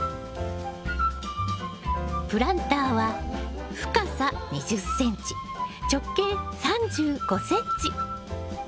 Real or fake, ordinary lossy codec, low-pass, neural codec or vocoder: real; none; none; none